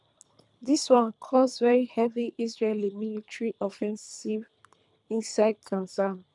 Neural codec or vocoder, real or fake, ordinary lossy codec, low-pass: codec, 24 kHz, 3 kbps, HILCodec; fake; none; 10.8 kHz